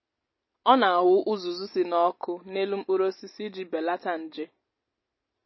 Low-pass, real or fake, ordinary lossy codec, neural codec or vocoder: 7.2 kHz; real; MP3, 24 kbps; none